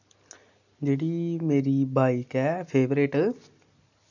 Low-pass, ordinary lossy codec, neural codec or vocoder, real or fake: 7.2 kHz; none; none; real